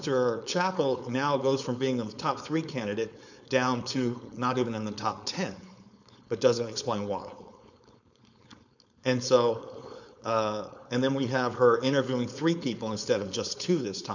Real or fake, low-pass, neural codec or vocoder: fake; 7.2 kHz; codec, 16 kHz, 4.8 kbps, FACodec